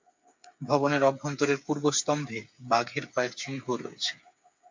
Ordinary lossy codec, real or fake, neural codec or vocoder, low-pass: MP3, 64 kbps; fake; codec, 16 kHz, 8 kbps, FreqCodec, smaller model; 7.2 kHz